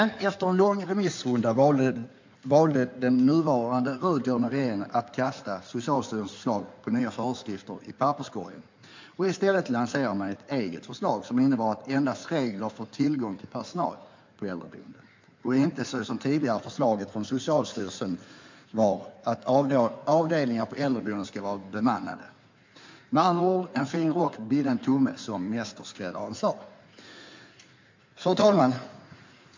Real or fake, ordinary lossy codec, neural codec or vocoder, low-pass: fake; MP3, 64 kbps; codec, 16 kHz in and 24 kHz out, 2.2 kbps, FireRedTTS-2 codec; 7.2 kHz